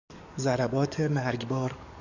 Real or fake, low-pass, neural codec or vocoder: fake; 7.2 kHz; codec, 44.1 kHz, 7.8 kbps, DAC